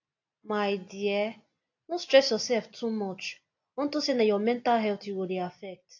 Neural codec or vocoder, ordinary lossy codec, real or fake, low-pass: none; AAC, 48 kbps; real; 7.2 kHz